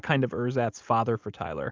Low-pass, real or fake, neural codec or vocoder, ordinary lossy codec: 7.2 kHz; real; none; Opus, 32 kbps